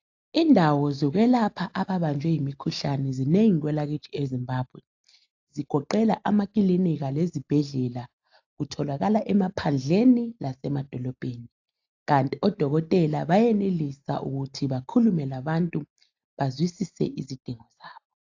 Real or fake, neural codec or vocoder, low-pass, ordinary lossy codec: real; none; 7.2 kHz; AAC, 48 kbps